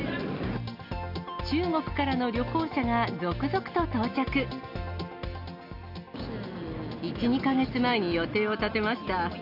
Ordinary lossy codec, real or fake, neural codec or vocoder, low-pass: AAC, 48 kbps; real; none; 5.4 kHz